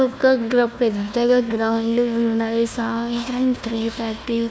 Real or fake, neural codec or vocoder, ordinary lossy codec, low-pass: fake; codec, 16 kHz, 1 kbps, FunCodec, trained on Chinese and English, 50 frames a second; none; none